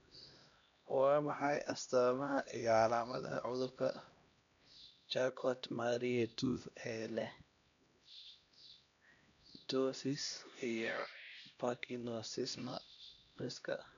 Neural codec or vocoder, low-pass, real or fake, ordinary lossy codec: codec, 16 kHz, 1 kbps, X-Codec, HuBERT features, trained on LibriSpeech; 7.2 kHz; fake; none